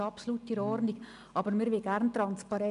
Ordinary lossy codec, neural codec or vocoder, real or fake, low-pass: none; none; real; 10.8 kHz